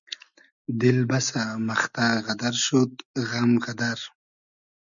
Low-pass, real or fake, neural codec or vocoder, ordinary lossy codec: 7.2 kHz; real; none; MP3, 96 kbps